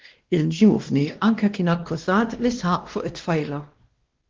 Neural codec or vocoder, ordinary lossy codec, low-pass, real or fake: codec, 16 kHz, 1 kbps, X-Codec, WavLM features, trained on Multilingual LibriSpeech; Opus, 16 kbps; 7.2 kHz; fake